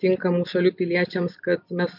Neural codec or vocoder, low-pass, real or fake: none; 5.4 kHz; real